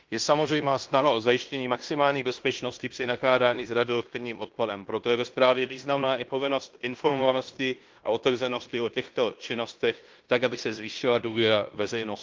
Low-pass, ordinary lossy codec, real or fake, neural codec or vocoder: 7.2 kHz; Opus, 32 kbps; fake; codec, 16 kHz in and 24 kHz out, 0.9 kbps, LongCat-Audio-Codec, fine tuned four codebook decoder